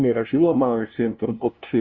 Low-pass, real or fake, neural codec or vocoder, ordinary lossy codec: 7.2 kHz; fake; codec, 16 kHz, 0.5 kbps, FunCodec, trained on LibriTTS, 25 frames a second; Opus, 64 kbps